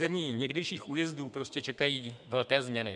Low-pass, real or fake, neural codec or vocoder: 10.8 kHz; fake; codec, 32 kHz, 1.9 kbps, SNAC